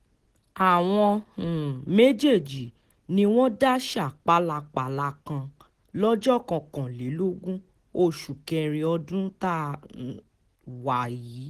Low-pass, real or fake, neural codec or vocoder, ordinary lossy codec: 14.4 kHz; real; none; Opus, 16 kbps